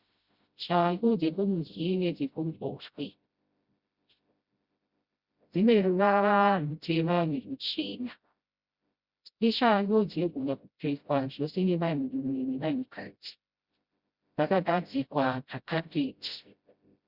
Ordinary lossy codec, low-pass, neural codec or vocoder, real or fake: Opus, 64 kbps; 5.4 kHz; codec, 16 kHz, 0.5 kbps, FreqCodec, smaller model; fake